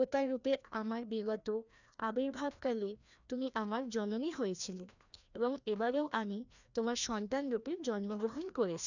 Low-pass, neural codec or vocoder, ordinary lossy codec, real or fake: 7.2 kHz; codec, 16 kHz, 1 kbps, FreqCodec, larger model; none; fake